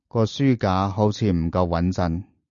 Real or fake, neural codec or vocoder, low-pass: real; none; 7.2 kHz